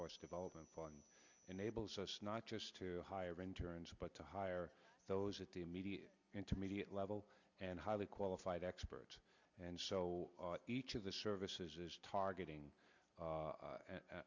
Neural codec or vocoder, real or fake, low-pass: none; real; 7.2 kHz